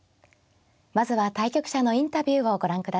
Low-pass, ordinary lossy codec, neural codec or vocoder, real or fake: none; none; none; real